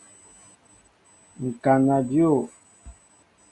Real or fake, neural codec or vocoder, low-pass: real; none; 10.8 kHz